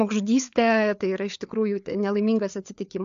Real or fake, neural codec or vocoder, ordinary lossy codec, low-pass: fake; codec, 16 kHz, 4 kbps, FreqCodec, larger model; AAC, 64 kbps; 7.2 kHz